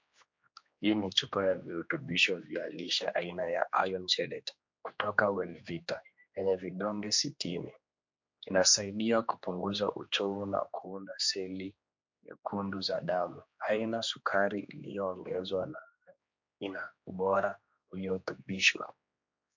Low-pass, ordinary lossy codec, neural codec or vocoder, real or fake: 7.2 kHz; MP3, 48 kbps; codec, 16 kHz, 2 kbps, X-Codec, HuBERT features, trained on general audio; fake